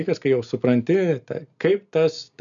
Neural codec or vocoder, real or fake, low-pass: none; real; 7.2 kHz